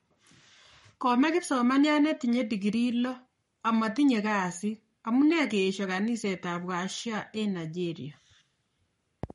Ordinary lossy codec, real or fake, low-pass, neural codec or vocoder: MP3, 48 kbps; fake; 19.8 kHz; codec, 44.1 kHz, 7.8 kbps, Pupu-Codec